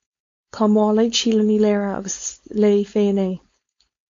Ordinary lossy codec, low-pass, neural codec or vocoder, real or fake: AAC, 48 kbps; 7.2 kHz; codec, 16 kHz, 4.8 kbps, FACodec; fake